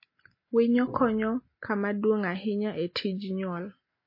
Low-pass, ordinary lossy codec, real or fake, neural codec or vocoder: 5.4 kHz; MP3, 24 kbps; real; none